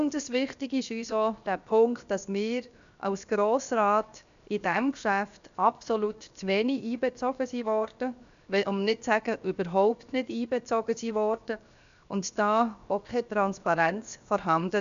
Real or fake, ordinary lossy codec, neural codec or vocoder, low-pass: fake; AAC, 96 kbps; codec, 16 kHz, 0.7 kbps, FocalCodec; 7.2 kHz